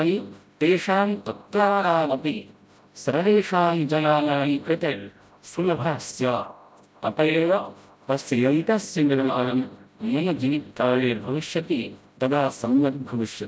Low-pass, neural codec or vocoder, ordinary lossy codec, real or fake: none; codec, 16 kHz, 0.5 kbps, FreqCodec, smaller model; none; fake